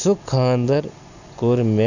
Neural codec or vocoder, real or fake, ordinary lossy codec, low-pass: none; real; none; 7.2 kHz